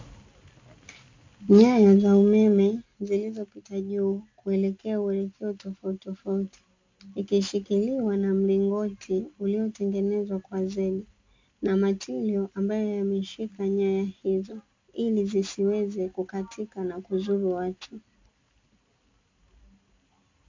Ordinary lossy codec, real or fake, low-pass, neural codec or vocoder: MP3, 64 kbps; real; 7.2 kHz; none